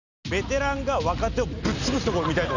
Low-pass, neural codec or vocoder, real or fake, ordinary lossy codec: 7.2 kHz; none; real; none